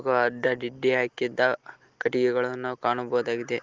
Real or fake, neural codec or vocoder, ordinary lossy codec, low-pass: real; none; Opus, 16 kbps; 7.2 kHz